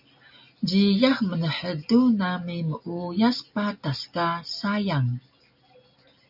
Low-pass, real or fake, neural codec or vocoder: 5.4 kHz; real; none